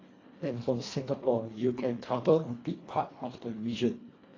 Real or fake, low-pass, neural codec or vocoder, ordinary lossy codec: fake; 7.2 kHz; codec, 24 kHz, 1.5 kbps, HILCodec; AAC, 32 kbps